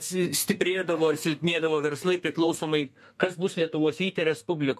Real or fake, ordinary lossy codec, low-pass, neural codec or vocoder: fake; MP3, 64 kbps; 14.4 kHz; codec, 32 kHz, 1.9 kbps, SNAC